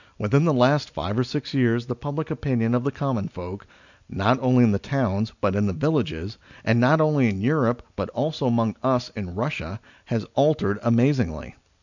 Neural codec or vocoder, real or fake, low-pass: none; real; 7.2 kHz